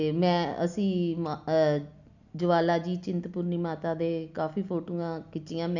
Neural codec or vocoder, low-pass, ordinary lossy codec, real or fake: none; 7.2 kHz; none; real